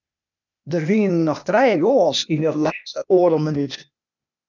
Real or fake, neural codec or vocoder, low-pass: fake; codec, 16 kHz, 0.8 kbps, ZipCodec; 7.2 kHz